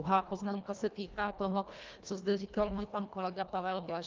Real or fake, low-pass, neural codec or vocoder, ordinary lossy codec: fake; 7.2 kHz; codec, 24 kHz, 1.5 kbps, HILCodec; Opus, 24 kbps